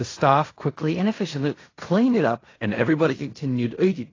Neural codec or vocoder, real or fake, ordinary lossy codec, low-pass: codec, 16 kHz in and 24 kHz out, 0.4 kbps, LongCat-Audio-Codec, fine tuned four codebook decoder; fake; AAC, 32 kbps; 7.2 kHz